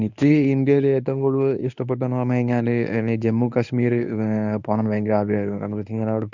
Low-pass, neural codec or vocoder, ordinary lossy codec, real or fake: 7.2 kHz; codec, 24 kHz, 0.9 kbps, WavTokenizer, medium speech release version 1; none; fake